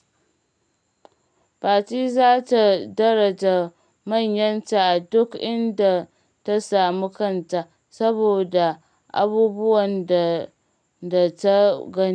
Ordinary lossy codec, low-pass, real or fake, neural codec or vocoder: none; 9.9 kHz; real; none